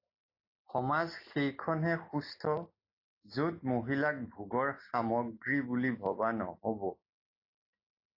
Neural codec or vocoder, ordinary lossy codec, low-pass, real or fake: none; AAC, 32 kbps; 5.4 kHz; real